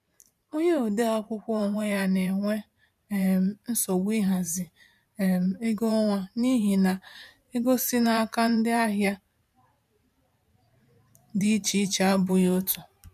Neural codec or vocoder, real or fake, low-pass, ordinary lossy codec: vocoder, 44.1 kHz, 128 mel bands every 512 samples, BigVGAN v2; fake; 14.4 kHz; none